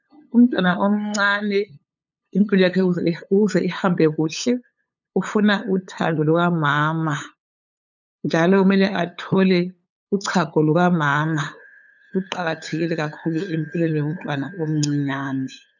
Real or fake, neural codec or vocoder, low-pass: fake; codec, 16 kHz, 8 kbps, FunCodec, trained on LibriTTS, 25 frames a second; 7.2 kHz